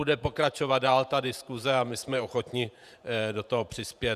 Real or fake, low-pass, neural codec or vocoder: fake; 14.4 kHz; vocoder, 48 kHz, 128 mel bands, Vocos